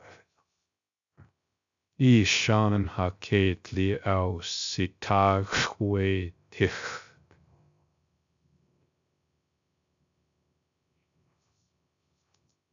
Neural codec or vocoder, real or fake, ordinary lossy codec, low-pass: codec, 16 kHz, 0.3 kbps, FocalCodec; fake; MP3, 64 kbps; 7.2 kHz